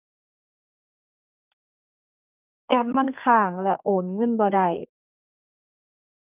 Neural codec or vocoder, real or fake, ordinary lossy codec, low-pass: codec, 32 kHz, 1.9 kbps, SNAC; fake; none; 3.6 kHz